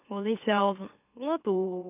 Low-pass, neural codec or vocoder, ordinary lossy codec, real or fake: 3.6 kHz; autoencoder, 44.1 kHz, a latent of 192 numbers a frame, MeloTTS; none; fake